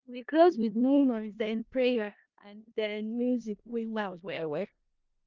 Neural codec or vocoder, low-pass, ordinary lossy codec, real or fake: codec, 16 kHz in and 24 kHz out, 0.4 kbps, LongCat-Audio-Codec, four codebook decoder; 7.2 kHz; Opus, 32 kbps; fake